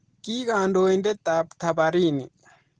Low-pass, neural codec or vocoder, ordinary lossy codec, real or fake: 9.9 kHz; none; Opus, 16 kbps; real